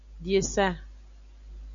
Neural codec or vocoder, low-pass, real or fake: none; 7.2 kHz; real